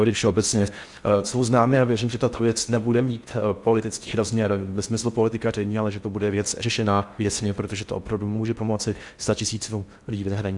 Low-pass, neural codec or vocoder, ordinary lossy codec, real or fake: 10.8 kHz; codec, 16 kHz in and 24 kHz out, 0.6 kbps, FocalCodec, streaming, 4096 codes; Opus, 64 kbps; fake